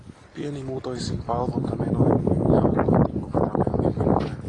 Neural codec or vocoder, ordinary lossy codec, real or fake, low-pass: none; AAC, 32 kbps; real; 10.8 kHz